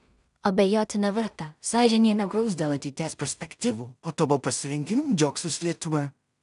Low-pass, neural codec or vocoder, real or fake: 10.8 kHz; codec, 16 kHz in and 24 kHz out, 0.4 kbps, LongCat-Audio-Codec, two codebook decoder; fake